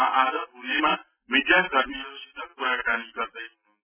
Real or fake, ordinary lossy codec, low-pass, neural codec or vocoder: real; none; 3.6 kHz; none